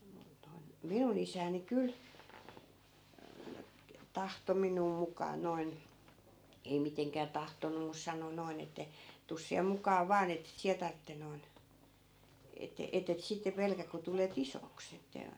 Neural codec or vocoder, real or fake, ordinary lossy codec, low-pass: none; real; none; none